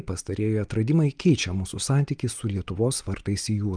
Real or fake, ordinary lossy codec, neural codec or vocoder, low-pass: real; Opus, 32 kbps; none; 9.9 kHz